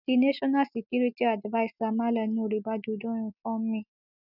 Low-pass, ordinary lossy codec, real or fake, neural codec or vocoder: 5.4 kHz; none; real; none